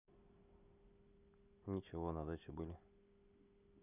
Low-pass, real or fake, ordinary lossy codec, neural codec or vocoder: 3.6 kHz; real; none; none